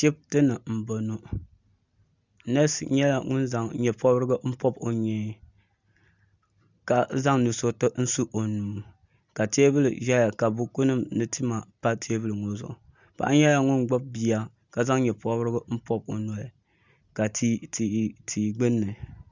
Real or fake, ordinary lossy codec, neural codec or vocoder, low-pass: real; Opus, 64 kbps; none; 7.2 kHz